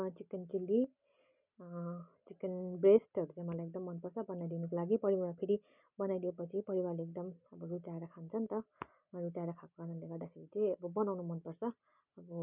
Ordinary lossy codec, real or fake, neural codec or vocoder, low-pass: none; real; none; 3.6 kHz